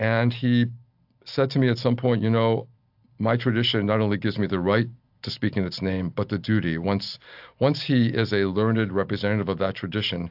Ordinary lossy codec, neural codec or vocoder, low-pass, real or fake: AAC, 48 kbps; none; 5.4 kHz; real